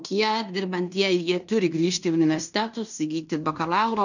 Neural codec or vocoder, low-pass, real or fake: codec, 16 kHz in and 24 kHz out, 0.9 kbps, LongCat-Audio-Codec, fine tuned four codebook decoder; 7.2 kHz; fake